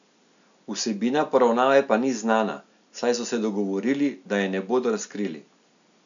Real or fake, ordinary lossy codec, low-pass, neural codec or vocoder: real; none; 7.2 kHz; none